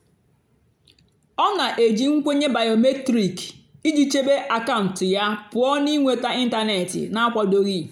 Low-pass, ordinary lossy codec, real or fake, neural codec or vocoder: 19.8 kHz; none; real; none